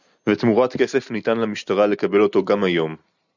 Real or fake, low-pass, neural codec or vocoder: real; 7.2 kHz; none